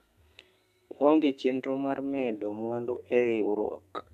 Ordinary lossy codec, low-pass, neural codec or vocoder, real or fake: none; 14.4 kHz; codec, 32 kHz, 1.9 kbps, SNAC; fake